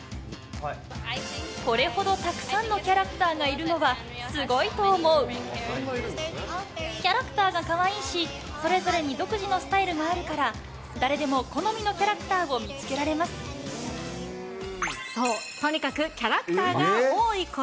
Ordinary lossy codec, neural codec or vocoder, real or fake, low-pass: none; none; real; none